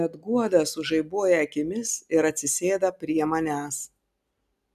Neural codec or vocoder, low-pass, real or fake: none; 14.4 kHz; real